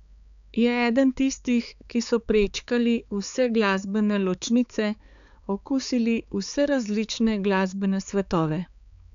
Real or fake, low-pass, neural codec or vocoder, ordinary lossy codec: fake; 7.2 kHz; codec, 16 kHz, 4 kbps, X-Codec, HuBERT features, trained on balanced general audio; none